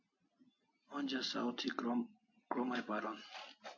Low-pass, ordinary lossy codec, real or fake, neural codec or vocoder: 7.2 kHz; MP3, 48 kbps; real; none